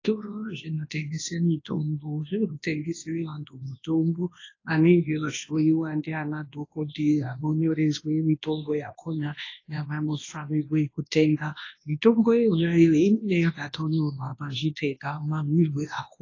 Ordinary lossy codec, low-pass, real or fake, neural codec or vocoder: AAC, 32 kbps; 7.2 kHz; fake; codec, 24 kHz, 0.9 kbps, WavTokenizer, large speech release